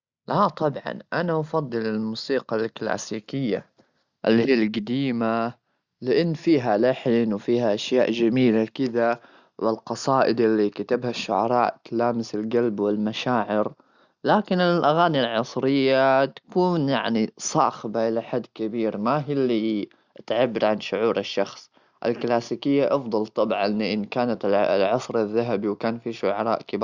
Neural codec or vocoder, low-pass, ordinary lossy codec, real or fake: none; 7.2 kHz; Opus, 64 kbps; real